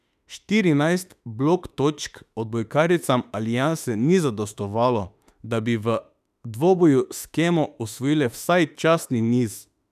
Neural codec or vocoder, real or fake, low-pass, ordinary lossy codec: autoencoder, 48 kHz, 32 numbers a frame, DAC-VAE, trained on Japanese speech; fake; 14.4 kHz; none